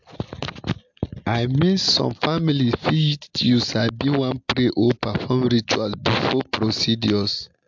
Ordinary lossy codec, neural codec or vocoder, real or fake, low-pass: MP3, 64 kbps; none; real; 7.2 kHz